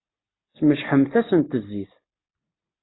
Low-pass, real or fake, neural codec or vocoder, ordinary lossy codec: 7.2 kHz; real; none; AAC, 16 kbps